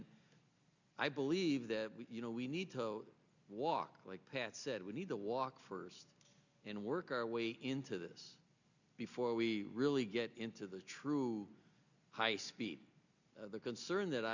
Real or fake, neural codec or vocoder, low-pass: real; none; 7.2 kHz